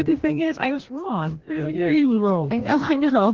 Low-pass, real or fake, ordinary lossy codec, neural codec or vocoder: 7.2 kHz; fake; Opus, 16 kbps; codec, 16 kHz, 1 kbps, FreqCodec, larger model